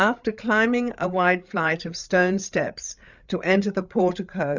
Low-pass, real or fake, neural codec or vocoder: 7.2 kHz; fake; codec, 16 kHz, 8 kbps, FreqCodec, larger model